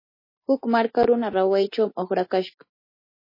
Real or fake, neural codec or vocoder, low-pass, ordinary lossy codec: real; none; 5.4 kHz; MP3, 24 kbps